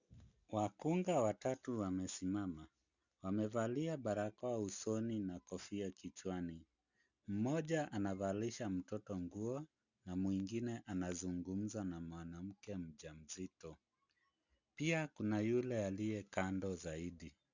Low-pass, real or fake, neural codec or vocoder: 7.2 kHz; real; none